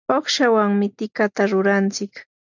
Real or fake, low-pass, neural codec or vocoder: real; 7.2 kHz; none